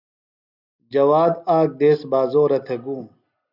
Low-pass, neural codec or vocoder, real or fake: 5.4 kHz; none; real